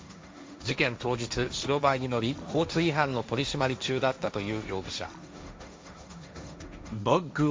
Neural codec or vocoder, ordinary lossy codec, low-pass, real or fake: codec, 16 kHz, 1.1 kbps, Voila-Tokenizer; none; none; fake